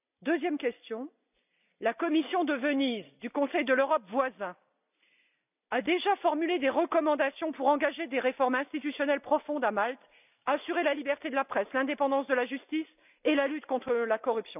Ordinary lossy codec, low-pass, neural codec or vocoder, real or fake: none; 3.6 kHz; none; real